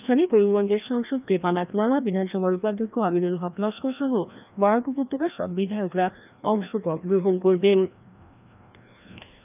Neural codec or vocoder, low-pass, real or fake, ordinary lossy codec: codec, 16 kHz, 1 kbps, FreqCodec, larger model; 3.6 kHz; fake; none